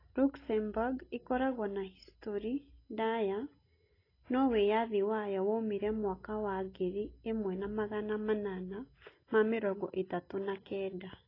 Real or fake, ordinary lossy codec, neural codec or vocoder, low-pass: real; AAC, 24 kbps; none; 5.4 kHz